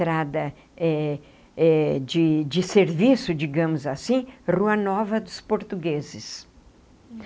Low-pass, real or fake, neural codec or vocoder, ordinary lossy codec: none; real; none; none